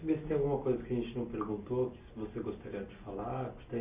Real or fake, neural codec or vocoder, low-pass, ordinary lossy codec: real; none; 3.6 kHz; Opus, 64 kbps